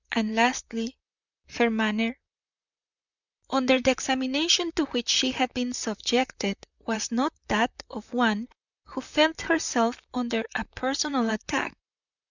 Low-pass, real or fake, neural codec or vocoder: 7.2 kHz; fake; vocoder, 44.1 kHz, 128 mel bands, Pupu-Vocoder